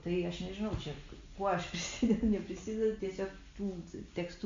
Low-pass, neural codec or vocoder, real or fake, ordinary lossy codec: 7.2 kHz; none; real; MP3, 48 kbps